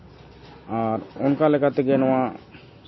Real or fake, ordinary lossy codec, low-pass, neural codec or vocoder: real; MP3, 24 kbps; 7.2 kHz; none